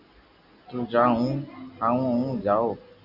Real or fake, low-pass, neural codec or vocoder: real; 5.4 kHz; none